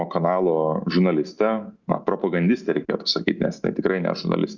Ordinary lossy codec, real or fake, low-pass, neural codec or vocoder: Opus, 64 kbps; real; 7.2 kHz; none